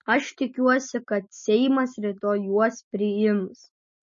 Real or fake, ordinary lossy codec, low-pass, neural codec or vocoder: real; MP3, 32 kbps; 7.2 kHz; none